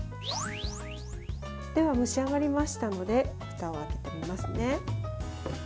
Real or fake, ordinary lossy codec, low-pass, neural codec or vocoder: real; none; none; none